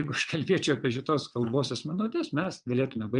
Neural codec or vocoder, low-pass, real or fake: vocoder, 22.05 kHz, 80 mel bands, WaveNeXt; 9.9 kHz; fake